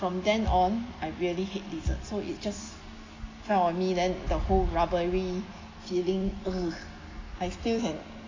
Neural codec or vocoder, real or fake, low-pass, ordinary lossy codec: none; real; 7.2 kHz; AAC, 32 kbps